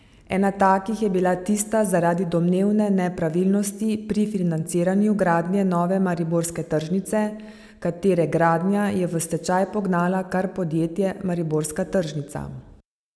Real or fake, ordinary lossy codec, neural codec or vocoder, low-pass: real; none; none; none